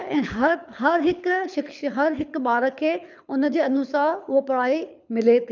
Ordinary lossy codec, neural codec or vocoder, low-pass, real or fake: none; codec, 24 kHz, 6 kbps, HILCodec; 7.2 kHz; fake